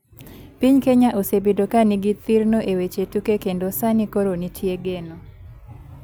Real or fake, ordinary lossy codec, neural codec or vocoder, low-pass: real; none; none; none